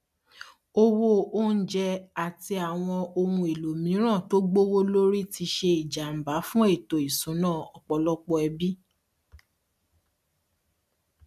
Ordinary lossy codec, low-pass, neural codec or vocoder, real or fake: MP3, 96 kbps; 14.4 kHz; none; real